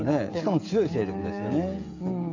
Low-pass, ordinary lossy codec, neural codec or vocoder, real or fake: 7.2 kHz; AAC, 48 kbps; codec, 16 kHz, 16 kbps, FreqCodec, smaller model; fake